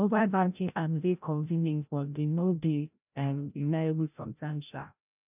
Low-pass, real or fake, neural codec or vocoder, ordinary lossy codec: 3.6 kHz; fake; codec, 16 kHz, 0.5 kbps, FreqCodec, larger model; none